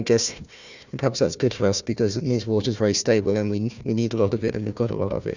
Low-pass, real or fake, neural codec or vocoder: 7.2 kHz; fake; codec, 16 kHz, 1 kbps, FunCodec, trained on Chinese and English, 50 frames a second